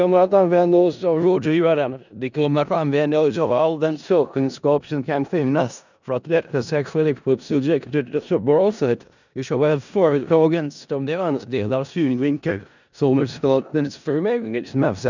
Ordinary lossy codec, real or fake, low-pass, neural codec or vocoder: none; fake; 7.2 kHz; codec, 16 kHz in and 24 kHz out, 0.4 kbps, LongCat-Audio-Codec, four codebook decoder